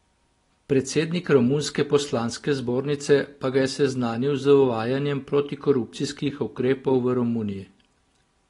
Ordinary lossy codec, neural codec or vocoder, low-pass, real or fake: AAC, 32 kbps; none; 10.8 kHz; real